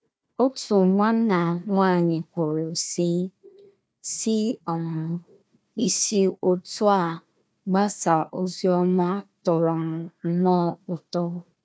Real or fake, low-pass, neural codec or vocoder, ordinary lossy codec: fake; none; codec, 16 kHz, 1 kbps, FunCodec, trained on Chinese and English, 50 frames a second; none